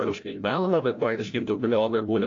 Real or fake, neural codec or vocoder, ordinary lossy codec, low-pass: fake; codec, 16 kHz, 0.5 kbps, FreqCodec, larger model; AAC, 48 kbps; 7.2 kHz